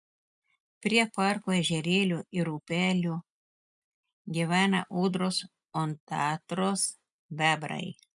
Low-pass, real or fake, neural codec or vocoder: 10.8 kHz; real; none